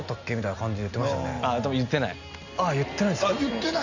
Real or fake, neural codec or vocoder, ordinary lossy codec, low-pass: real; none; none; 7.2 kHz